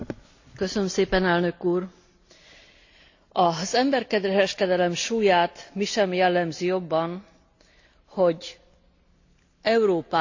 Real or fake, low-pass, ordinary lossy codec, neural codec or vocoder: real; 7.2 kHz; AAC, 48 kbps; none